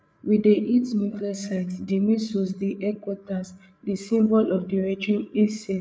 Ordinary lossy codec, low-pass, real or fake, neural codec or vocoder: none; none; fake; codec, 16 kHz, 8 kbps, FreqCodec, larger model